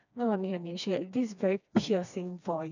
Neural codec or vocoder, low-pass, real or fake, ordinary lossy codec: codec, 16 kHz, 2 kbps, FreqCodec, smaller model; 7.2 kHz; fake; none